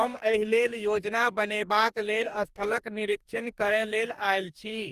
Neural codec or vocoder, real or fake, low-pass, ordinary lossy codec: codec, 44.1 kHz, 2.6 kbps, DAC; fake; 14.4 kHz; Opus, 32 kbps